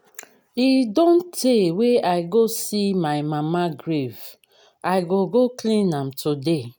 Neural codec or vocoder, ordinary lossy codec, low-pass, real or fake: none; none; none; real